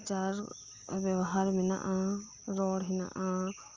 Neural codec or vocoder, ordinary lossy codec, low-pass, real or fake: none; Opus, 32 kbps; 7.2 kHz; real